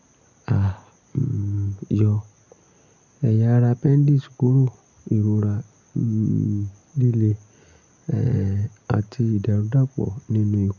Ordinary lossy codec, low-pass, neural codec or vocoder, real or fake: none; 7.2 kHz; none; real